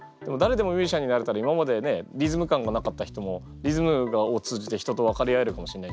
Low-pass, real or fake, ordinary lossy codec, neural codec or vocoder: none; real; none; none